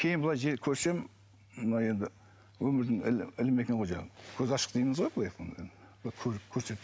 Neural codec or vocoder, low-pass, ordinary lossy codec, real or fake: none; none; none; real